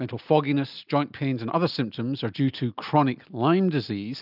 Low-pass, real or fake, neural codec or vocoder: 5.4 kHz; real; none